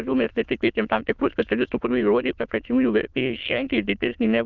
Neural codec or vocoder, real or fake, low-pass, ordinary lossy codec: autoencoder, 22.05 kHz, a latent of 192 numbers a frame, VITS, trained on many speakers; fake; 7.2 kHz; Opus, 32 kbps